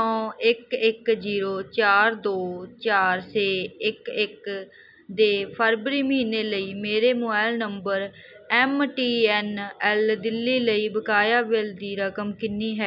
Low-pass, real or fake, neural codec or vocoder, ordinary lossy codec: 5.4 kHz; real; none; none